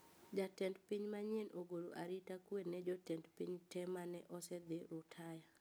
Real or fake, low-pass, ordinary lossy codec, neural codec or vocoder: real; none; none; none